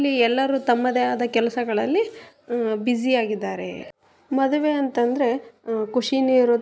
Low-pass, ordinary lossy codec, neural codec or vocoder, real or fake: none; none; none; real